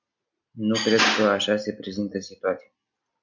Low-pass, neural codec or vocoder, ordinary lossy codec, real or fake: 7.2 kHz; none; MP3, 64 kbps; real